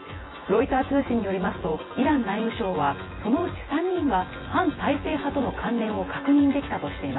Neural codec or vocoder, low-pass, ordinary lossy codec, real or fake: vocoder, 24 kHz, 100 mel bands, Vocos; 7.2 kHz; AAC, 16 kbps; fake